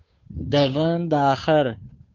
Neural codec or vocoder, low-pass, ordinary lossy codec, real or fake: codec, 16 kHz, 2 kbps, FunCodec, trained on Chinese and English, 25 frames a second; 7.2 kHz; MP3, 48 kbps; fake